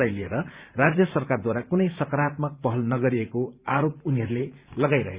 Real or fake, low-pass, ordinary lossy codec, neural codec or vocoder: fake; 3.6 kHz; Opus, 64 kbps; vocoder, 44.1 kHz, 128 mel bands every 512 samples, BigVGAN v2